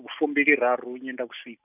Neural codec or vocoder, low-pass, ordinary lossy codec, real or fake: none; 3.6 kHz; none; real